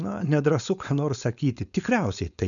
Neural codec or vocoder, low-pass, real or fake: codec, 16 kHz, 4.8 kbps, FACodec; 7.2 kHz; fake